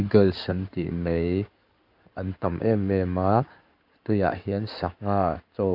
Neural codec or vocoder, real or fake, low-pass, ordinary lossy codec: codec, 16 kHz in and 24 kHz out, 2.2 kbps, FireRedTTS-2 codec; fake; 5.4 kHz; AAC, 48 kbps